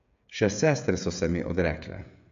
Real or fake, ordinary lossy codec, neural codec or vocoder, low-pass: fake; MP3, 64 kbps; codec, 16 kHz, 16 kbps, FreqCodec, smaller model; 7.2 kHz